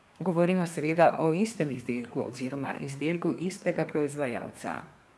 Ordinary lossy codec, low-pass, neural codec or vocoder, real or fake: none; none; codec, 24 kHz, 1 kbps, SNAC; fake